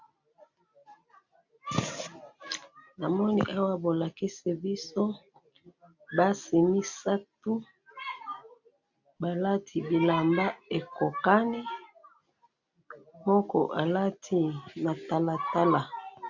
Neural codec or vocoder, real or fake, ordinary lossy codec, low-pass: none; real; MP3, 64 kbps; 7.2 kHz